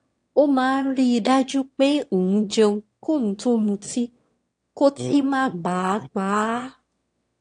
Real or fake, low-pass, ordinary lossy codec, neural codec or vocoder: fake; 9.9 kHz; AAC, 48 kbps; autoencoder, 22.05 kHz, a latent of 192 numbers a frame, VITS, trained on one speaker